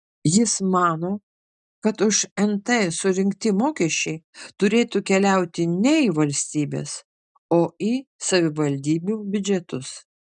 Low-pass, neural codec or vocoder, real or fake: 9.9 kHz; none; real